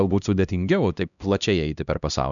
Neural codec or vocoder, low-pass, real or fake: codec, 16 kHz, 1 kbps, X-Codec, HuBERT features, trained on LibriSpeech; 7.2 kHz; fake